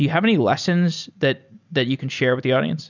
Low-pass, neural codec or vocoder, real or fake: 7.2 kHz; none; real